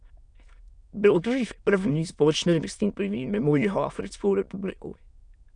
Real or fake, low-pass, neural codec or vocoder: fake; 9.9 kHz; autoencoder, 22.05 kHz, a latent of 192 numbers a frame, VITS, trained on many speakers